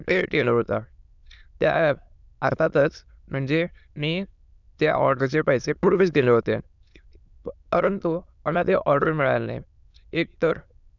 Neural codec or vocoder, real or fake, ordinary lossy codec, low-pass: autoencoder, 22.05 kHz, a latent of 192 numbers a frame, VITS, trained on many speakers; fake; none; 7.2 kHz